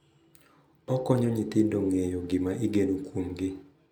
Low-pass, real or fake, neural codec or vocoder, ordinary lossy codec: 19.8 kHz; real; none; none